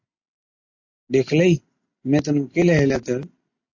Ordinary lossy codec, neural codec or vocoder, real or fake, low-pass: AAC, 48 kbps; none; real; 7.2 kHz